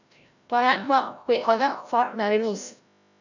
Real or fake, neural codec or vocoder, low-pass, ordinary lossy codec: fake; codec, 16 kHz, 0.5 kbps, FreqCodec, larger model; 7.2 kHz; none